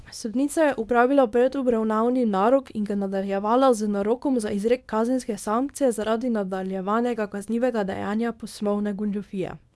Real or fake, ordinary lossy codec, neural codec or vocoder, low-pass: fake; none; codec, 24 kHz, 0.9 kbps, WavTokenizer, small release; none